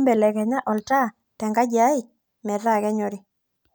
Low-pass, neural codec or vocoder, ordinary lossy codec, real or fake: none; none; none; real